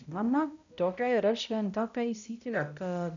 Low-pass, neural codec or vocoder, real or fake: 7.2 kHz; codec, 16 kHz, 0.5 kbps, X-Codec, HuBERT features, trained on balanced general audio; fake